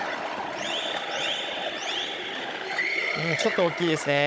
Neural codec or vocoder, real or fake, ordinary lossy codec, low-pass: codec, 16 kHz, 16 kbps, FunCodec, trained on Chinese and English, 50 frames a second; fake; none; none